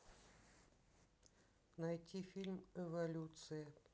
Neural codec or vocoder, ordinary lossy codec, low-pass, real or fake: none; none; none; real